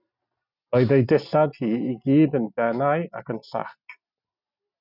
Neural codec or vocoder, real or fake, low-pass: none; real; 5.4 kHz